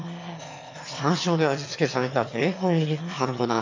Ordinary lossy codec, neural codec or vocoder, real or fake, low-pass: MP3, 48 kbps; autoencoder, 22.05 kHz, a latent of 192 numbers a frame, VITS, trained on one speaker; fake; 7.2 kHz